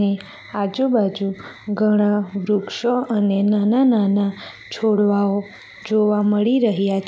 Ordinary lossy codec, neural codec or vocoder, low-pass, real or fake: none; none; none; real